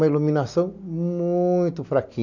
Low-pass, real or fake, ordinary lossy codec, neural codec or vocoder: 7.2 kHz; real; none; none